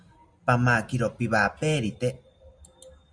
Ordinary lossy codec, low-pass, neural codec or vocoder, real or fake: Opus, 64 kbps; 9.9 kHz; none; real